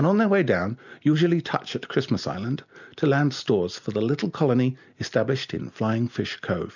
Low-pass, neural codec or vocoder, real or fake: 7.2 kHz; none; real